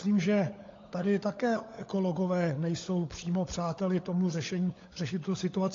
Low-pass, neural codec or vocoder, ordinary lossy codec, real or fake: 7.2 kHz; codec, 16 kHz, 16 kbps, FunCodec, trained on LibriTTS, 50 frames a second; AAC, 32 kbps; fake